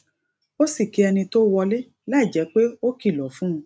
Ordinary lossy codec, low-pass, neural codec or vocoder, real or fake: none; none; none; real